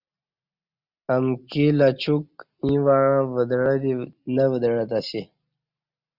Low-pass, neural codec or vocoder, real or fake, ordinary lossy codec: 5.4 kHz; none; real; Opus, 64 kbps